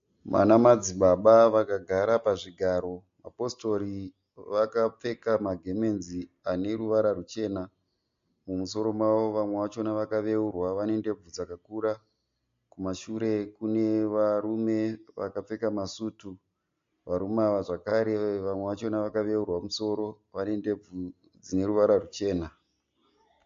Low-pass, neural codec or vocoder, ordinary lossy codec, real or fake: 7.2 kHz; none; MP3, 48 kbps; real